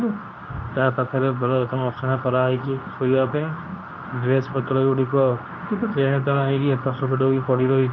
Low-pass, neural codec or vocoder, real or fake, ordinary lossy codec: 7.2 kHz; codec, 24 kHz, 0.9 kbps, WavTokenizer, medium speech release version 2; fake; MP3, 64 kbps